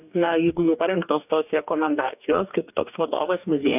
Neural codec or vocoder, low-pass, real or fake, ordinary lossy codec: codec, 44.1 kHz, 2.6 kbps, DAC; 3.6 kHz; fake; AAC, 32 kbps